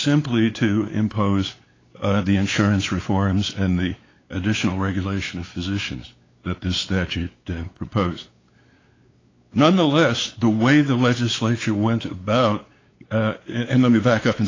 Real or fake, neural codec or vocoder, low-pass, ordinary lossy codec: fake; codec, 16 kHz, 4 kbps, X-Codec, WavLM features, trained on Multilingual LibriSpeech; 7.2 kHz; AAC, 32 kbps